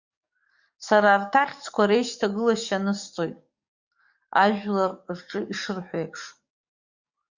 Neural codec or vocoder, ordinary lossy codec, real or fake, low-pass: codec, 44.1 kHz, 7.8 kbps, DAC; Opus, 64 kbps; fake; 7.2 kHz